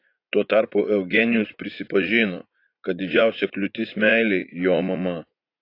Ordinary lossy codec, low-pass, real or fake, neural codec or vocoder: AAC, 32 kbps; 5.4 kHz; fake; vocoder, 44.1 kHz, 80 mel bands, Vocos